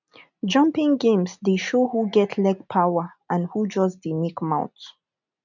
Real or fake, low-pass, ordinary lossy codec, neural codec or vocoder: real; 7.2 kHz; none; none